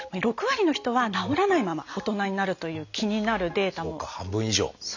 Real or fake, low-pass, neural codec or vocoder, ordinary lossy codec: real; 7.2 kHz; none; none